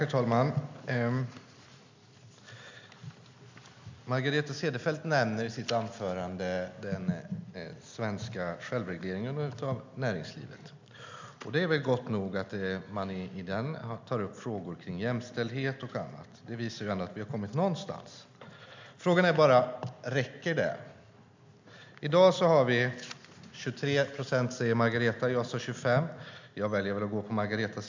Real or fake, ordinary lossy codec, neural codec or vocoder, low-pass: real; MP3, 64 kbps; none; 7.2 kHz